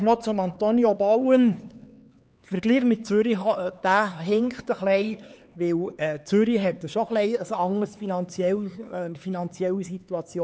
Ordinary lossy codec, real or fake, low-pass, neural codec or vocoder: none; fake; none; codec, 16 kHz, 4 kbps, X-Codec, HuBERT features, trained on LibriSpeech